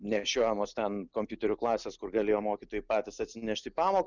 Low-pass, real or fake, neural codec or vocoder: 7.2 kHz; real; none